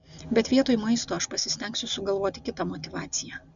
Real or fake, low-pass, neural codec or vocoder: real; 7.2 kHz; none